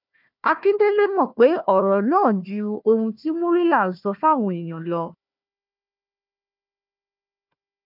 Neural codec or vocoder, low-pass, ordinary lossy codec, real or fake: codec, 16 kHz, 1 kbps, FunCodec, trained on Chinese and English, 50 frames a second; 5.4 kHz; none; fake